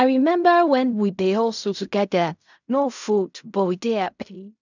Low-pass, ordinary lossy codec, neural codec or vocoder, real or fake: 7.2 kHz; none; codec, 16 kHz in and 24 kHz out, 0.4 kbps, LongCat-Audio-Codec, fine tuned four codebook decoder; fake